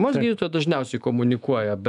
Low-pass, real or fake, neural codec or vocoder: 10.8 kHz; fake; autoencoder, 48 kHz, 128 numbers a frame, DAC-VAE, trained on Japanese speech